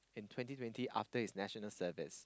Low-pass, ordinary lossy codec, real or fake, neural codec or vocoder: none; none; real; none